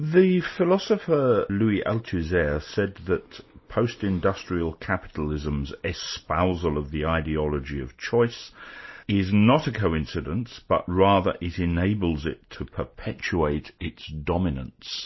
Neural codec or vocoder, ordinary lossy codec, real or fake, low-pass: none; MP3, 24 kbps; real; 7.2 kHz